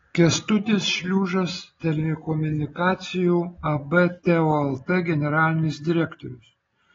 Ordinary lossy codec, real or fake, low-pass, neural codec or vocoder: AAC, 24 kbps; real; 7.2 kHz; none